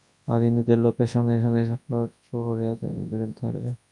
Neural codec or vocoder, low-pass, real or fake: codec, 24 kHz, 0.9 kbps, WavTokenizer, large speech release; 10.8 kHz; fake